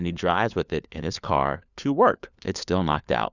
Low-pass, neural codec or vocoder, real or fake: 7.2 kHz; codec, 16 kHz, 4 kbps, FunCodec, trained on LibriTTS, 50 frames a second; fake